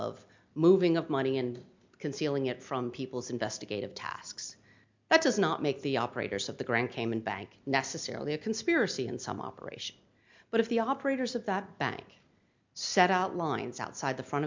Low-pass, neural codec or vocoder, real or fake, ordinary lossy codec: 7.2 kHz; none; real; MP3, 64 kbps